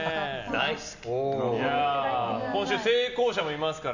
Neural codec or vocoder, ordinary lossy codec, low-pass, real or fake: none; none; 7.2 kHz; real